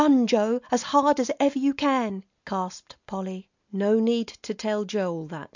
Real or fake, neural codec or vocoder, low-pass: real; none; 7.2 kHz